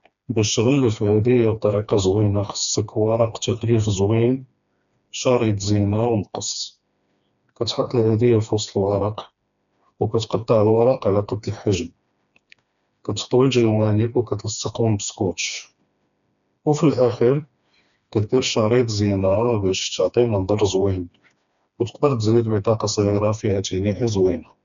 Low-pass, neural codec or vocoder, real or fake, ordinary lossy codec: 7.2 kHz; codec, 16 kHz, 2 kbps, FreqCodec, smaller model; fake; none